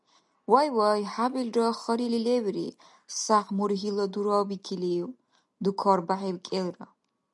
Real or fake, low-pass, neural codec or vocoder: real; 10.8 kHz; none